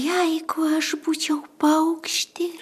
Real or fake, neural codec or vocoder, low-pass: fake; vocoder, 44.1 kHz, 128 mel bands every 512 samples, BigVGAN v2; 14.4 kHz